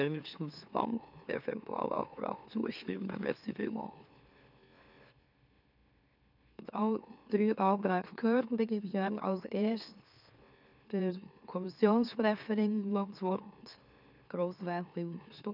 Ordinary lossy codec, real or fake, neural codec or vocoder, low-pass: none; fake; autoencoder, 44.1 kHz, a latent of 192 numbers a frame, MeloTTS; 5.4 kHz